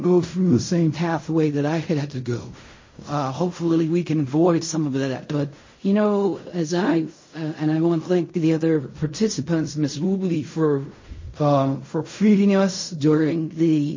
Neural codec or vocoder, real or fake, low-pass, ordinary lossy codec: codec, 16 kHz in and 24 kHz out, 0.4 kbps, LongCat-Audio-Codec, fine tuned four codebook decoder; fake; 7.2 kHz; MP3, 32 kbps